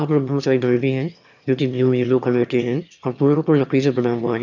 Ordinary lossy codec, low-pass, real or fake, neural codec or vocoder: none; 7.2 kHz; fake; autoencoder, 22.05 kHz, a latent of 192 numbers a frame, VITS, trained on one speaker